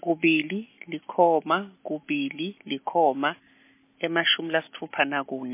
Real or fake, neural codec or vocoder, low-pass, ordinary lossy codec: real; none; 3.6 kHz; MP3, 24 kbps